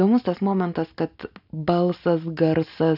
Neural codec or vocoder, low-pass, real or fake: none; 5.4 kHz; real